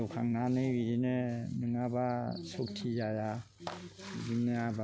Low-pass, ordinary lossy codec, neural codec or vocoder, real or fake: none; none; none; real